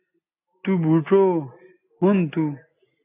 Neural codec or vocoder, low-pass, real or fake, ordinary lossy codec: none; 3.6 kHz; real; AAC, 24 kbps